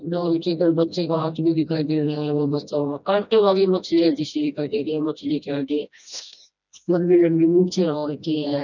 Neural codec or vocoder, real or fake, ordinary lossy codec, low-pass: codec, 16 kHz, 1 kbps, FreqCodec, smaller model; fake; none; 7.2 kHz